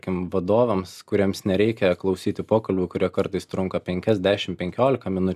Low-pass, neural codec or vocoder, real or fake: 14.4 kHz; none; real